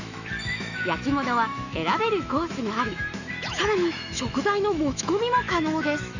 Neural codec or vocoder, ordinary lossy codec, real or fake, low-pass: autoencoder, 48 kHz, 128 numbers a frame, DAC-VAE, trained on Japanese speech; none; fake; 7.2 kHz